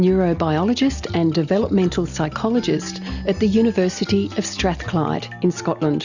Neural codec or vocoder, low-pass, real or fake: none; 7.2 kHz; real